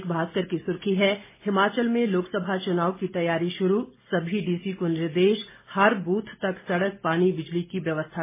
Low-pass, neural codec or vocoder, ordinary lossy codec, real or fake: 3.6 kHz; none; MP3, 16 kbps; real